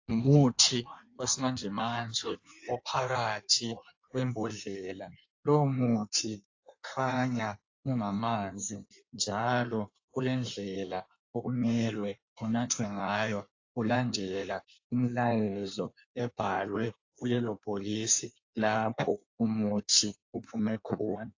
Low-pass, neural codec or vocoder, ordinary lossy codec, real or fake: 7.2 kHz; codec, 16 kHz in and 24 kHz out, 1.1 kbps, FireRedTTS-2 codec; AAC, 48 kbps; fake